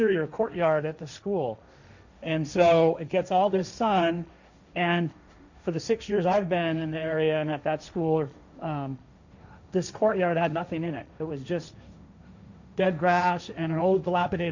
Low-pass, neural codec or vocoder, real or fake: 7.2 kHz; codec, 16 kHz, 1.1 kbps, Voila-Tokenizer; fake